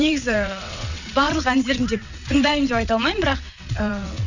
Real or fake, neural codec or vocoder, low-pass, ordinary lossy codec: fake; vocoder, 22.05 kHz, 80 mel bands, WaveNeXt; 7.2 kHz; none